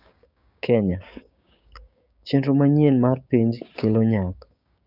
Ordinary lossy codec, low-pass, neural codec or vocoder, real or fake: none; 5.4 kHz; codec, 16 kHz, 6 kbps, DAC; fake